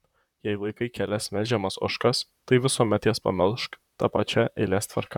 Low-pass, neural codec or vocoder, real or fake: 19.8 kHz; vocoder, 44.1 kHz, 128 mel bands, Pupu-Vocoder; fake